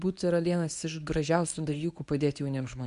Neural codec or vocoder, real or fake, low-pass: codec, 24 kHz, 0.9 kbps, WavTokenizer, medium speech release version 1; fake; 10.8 kHz